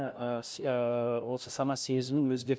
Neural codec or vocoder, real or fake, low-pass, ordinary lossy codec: codec, 16 kHz, 0.5 kbps, FunCodec, trained on LibriTTS, 25 frames a second; fake; none; none